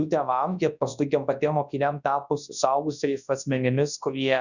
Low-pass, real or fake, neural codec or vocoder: 7.2 kHz; fake; codec, 24 kHz, 0.9 kbps, WavTokenizer, large speech release